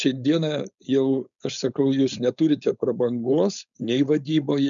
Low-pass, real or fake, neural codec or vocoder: 7.2 kHz; fake; codec, 16 kHz, 4.8 kbps, FACodec